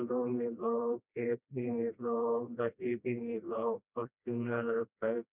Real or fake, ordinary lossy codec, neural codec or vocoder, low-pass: fake; none; codec, 16 kHz, 1 kbps, FreqCodec, smaller model; 3.6 kHz